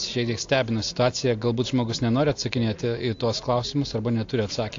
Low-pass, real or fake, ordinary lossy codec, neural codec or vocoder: 7.2 kHz; real; AAC, 48 kbps; none